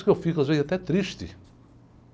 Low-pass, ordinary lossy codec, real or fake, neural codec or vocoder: none; none; real; none